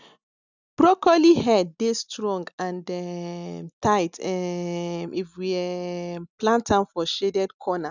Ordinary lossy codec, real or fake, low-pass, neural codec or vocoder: none; real; 7.2 kHz; none